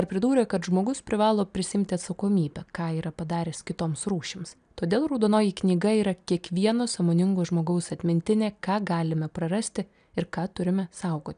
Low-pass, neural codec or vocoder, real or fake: 9.9 kHz; none; real